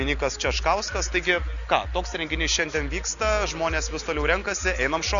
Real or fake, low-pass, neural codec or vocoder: real; 7.2 kHz; none